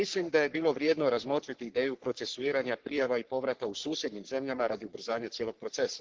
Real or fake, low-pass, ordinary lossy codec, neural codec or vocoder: fake; 7.2 kHz; Opus, 16 kbps; codec, 44.1 kHz, 3.4 kbps, Pupu-Codec